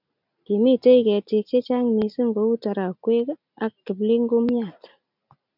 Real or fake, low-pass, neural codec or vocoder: real; 5.4 kHz; none